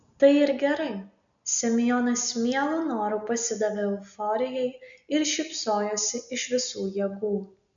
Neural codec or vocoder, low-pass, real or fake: none; 7.2 kHz; real